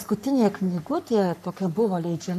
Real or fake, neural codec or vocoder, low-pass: fake; codec, 44.1 kHz, 3.4 kbps, Pupu-Codec; 14.4 kHz